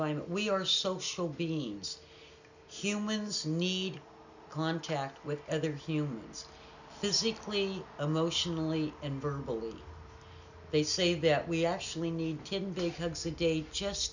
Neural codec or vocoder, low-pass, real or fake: none; 7.2 kHz; real